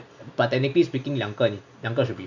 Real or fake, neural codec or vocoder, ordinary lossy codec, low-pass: real; none; none; 7.2 kHz